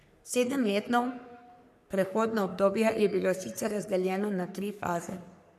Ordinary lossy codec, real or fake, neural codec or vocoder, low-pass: none; fake; codec, 44.1 kHz, 3.4 kbps, Pupu-Codec; 14.4 kHz